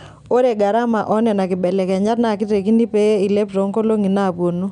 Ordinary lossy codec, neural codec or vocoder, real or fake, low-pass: none; none; real; 9.9 kHz